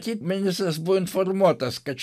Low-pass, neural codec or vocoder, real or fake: 14.4 kHz; none; real